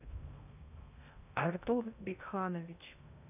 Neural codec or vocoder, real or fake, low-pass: codec, 16 kHz in and 24 kHz out, 0.6 kbps, FocalCodec, streaming, 2048 codes; fake; 3.6 kHz